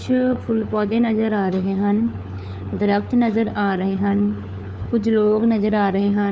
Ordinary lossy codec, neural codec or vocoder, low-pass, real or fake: none; codec, 16 kHz, 4 kbps, FunCodec, trained on LibriTTS, 50 frames a second; none; fake